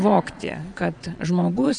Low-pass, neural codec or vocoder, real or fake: 9.9 kHz; vocoder, 22.05 kHz, 80 mel bands, Vocos; fake